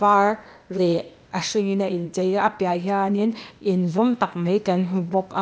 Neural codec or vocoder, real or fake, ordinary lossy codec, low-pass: codec, 16 kHz, 0.8 kbps, ZipCodec; fake; none; none